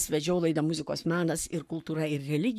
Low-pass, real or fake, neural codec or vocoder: 14.4 kHz; fake; codec, 44.1 kHz, 7.8 kbps, Pupu-Codec